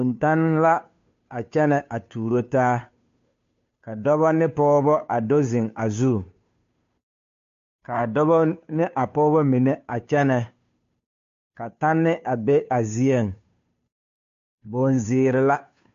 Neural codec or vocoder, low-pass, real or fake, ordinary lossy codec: codec, 16 kHz, 2 kbps, FunCodec, trained on Chinese and English, 25 frames a second; 7.2 kHz; fake; MP3, 48 kbps